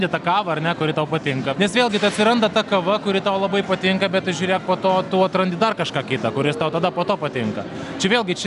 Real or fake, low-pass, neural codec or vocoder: real; 10.8 kHz; none